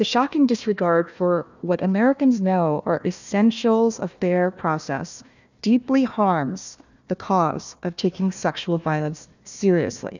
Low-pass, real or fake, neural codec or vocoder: 7.2 kHz; fake; codec, 16 kHz, 1 kbps, FunCodec, trained on Chinese and English, 50 frames a second